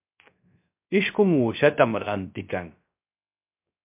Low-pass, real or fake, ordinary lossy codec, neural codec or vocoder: 3.6 kHz; fake; MP3, 32 kbps; codec, 16 kHz, 0.3 kbps, FocalCodec